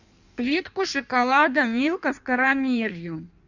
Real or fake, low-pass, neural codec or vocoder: fake; 7.2 kHz; codec, 16 kHz in and 24 kHz out, 1.1 kbps, FireRedTTS-2 codec